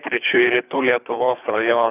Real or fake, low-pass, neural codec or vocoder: fake; 3.6 kHz; codec, 24 kHz, 3 kbps, HILCodec